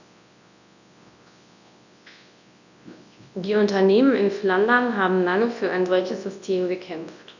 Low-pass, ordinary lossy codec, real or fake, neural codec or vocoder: 7.2 kHz; none; fake; codec, 24 kHz, 0.9 kbps, WavTokenizer, large speech release